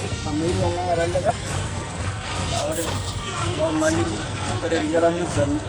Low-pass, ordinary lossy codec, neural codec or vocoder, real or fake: none; none; codec, 44.1 kHz, 2.6 kbps, SNAC; fake